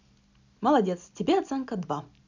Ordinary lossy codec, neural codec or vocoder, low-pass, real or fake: none; none; 7.2 kHz; real